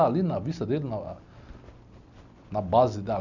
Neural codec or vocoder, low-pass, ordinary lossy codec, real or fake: none; 7.2 kHz; none; real